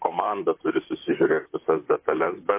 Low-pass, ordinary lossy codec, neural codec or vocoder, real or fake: 3.6 kHz; MP3, 24 kbps; vocoder, 44.1 kHz, 128 mel bands, Pupu-Vocoder; fake